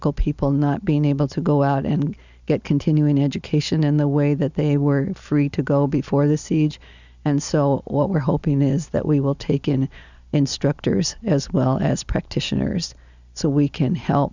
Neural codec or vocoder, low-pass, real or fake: none; 7.2 kHz; real